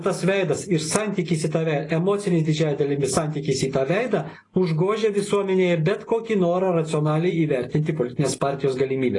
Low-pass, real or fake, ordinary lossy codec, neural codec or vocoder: 10.8 kHz; real; AAC, 32 kbps; none